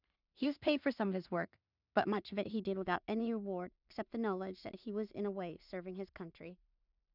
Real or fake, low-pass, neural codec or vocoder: fake; 5.4 kHz; codec, 16 kHz in and 24 kHz out, 0.4 kbps, LongCat-Audio-Codec, two codebook decoder